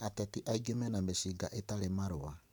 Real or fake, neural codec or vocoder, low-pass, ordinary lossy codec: fake; vocoder, 44.1 kHz, 128 mel bands every 512 samples, BigVGAN v2; none; none